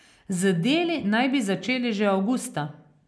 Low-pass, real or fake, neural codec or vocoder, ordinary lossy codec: none; real; none; none